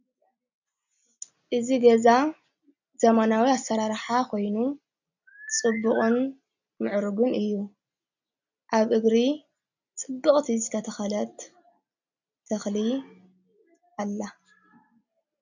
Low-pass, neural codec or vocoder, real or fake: 7.2 kHz; none; real